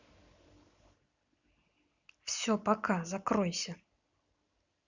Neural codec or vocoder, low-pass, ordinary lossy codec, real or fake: none; 7.2 kHz; Opus, 64 kbps; real